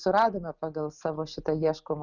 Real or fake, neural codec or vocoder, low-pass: real; none; 7.2 kHz